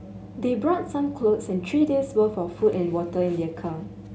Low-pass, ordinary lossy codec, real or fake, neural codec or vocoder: none; none; real; none